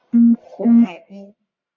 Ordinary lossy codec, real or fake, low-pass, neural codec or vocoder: MP3, 48 kbps; fake; 7.2 kHz; codec, 44.1 kHz, 1.7 kbps, Pupu-Codec